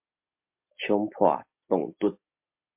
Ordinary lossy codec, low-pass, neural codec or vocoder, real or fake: MP3, 32 kbps; 3.6 kHz; none; real